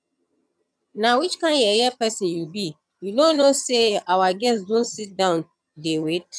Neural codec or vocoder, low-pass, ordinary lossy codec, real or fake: vocoder, 22.05 kHz, 80 mel bands, HiFi-GAN; none; none; fake